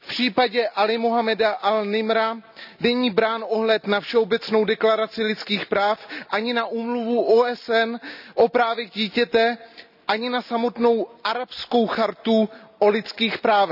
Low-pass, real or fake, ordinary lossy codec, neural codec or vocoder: 5.4 kHz; real; none; none